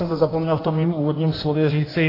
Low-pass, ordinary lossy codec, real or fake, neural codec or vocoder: 5.4 kHz; AAC, 24 kbps; fake; codec, 16 kHz in and 24 kHz out, 1.1 kbps, FireRedTTS-2 codec